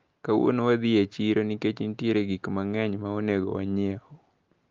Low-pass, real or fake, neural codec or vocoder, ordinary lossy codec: 7.2 kHz; real; none; Opus, 32 kbps